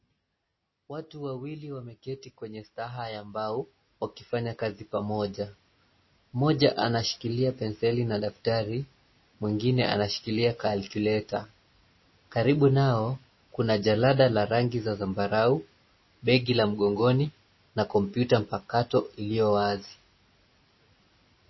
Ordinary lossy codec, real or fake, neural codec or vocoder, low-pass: MP3, 24 kbps; real; none; 7.2 kHz